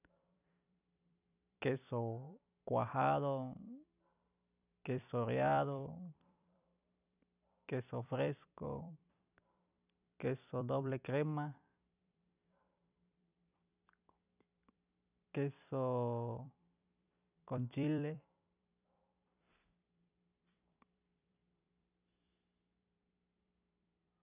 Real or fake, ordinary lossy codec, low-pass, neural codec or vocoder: fake; none; 3.6 kHz; vocoder, 44.1 kHz, 128 mel bands every 256 samples, BigVGAN v2